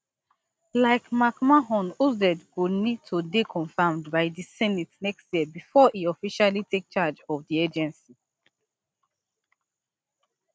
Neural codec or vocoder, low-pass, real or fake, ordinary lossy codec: none; none; real; none